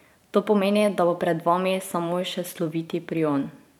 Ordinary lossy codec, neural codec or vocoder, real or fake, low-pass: none; vocoder, 44.1 kHz, 128 mel bands every 512 samples, BigVGAN v2; fake; 19.8 kHz